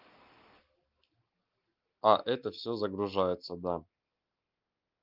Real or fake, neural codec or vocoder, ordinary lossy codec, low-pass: real; none; Opus, 24 kbps; 5.4 kHz